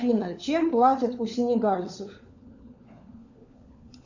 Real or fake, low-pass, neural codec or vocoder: fake; 7.2 kHz; codec, 16 kHz, 4 kbps, FunCodec, trained on LibriTTS, 50 frames a second